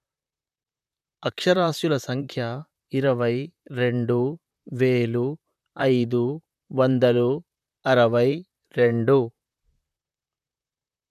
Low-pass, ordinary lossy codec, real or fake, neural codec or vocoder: 14.4 kHz; none; fake; vocoder, 44.1 kHz, 128 mel bands, Pupu-Vocoder